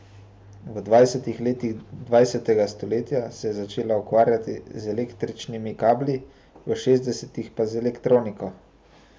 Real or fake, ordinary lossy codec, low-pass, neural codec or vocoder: real; none; none; none